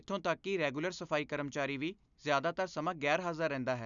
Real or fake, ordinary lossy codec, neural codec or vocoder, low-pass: real; none; none; 7.2 kHz